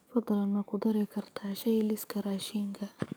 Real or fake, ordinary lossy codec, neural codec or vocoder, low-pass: fake; none; codec, 44.1 kHz, 7.8 kbps, DAC; none